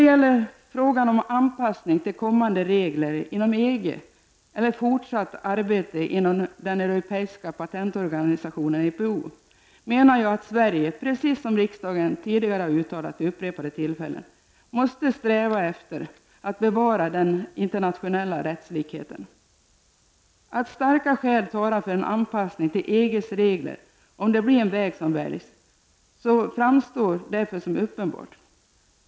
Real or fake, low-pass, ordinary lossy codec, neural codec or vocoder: real; none; none; none